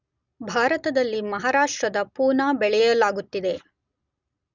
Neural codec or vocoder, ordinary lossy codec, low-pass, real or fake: none; none; 7.2 kHz; real